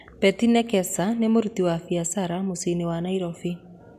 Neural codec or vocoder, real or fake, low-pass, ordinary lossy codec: none; real; 14.4 kHz; none